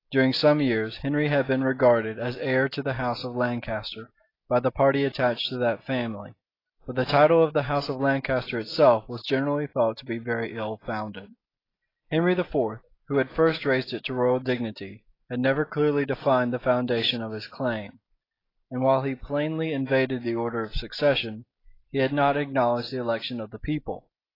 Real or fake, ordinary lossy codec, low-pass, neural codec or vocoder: real; AAC, 24 kbps; 5.4 kHz; none